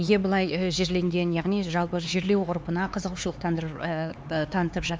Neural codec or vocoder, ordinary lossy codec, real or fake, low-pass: codec, 16 kHz, 4 kbps, X-Codec, HuBERT features, trained on LibriSpeech; none; fake; none